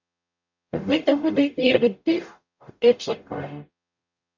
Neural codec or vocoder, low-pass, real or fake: codec, 44.1 kHz, 0.9 kbps, DAC; 7.2 kHz; fake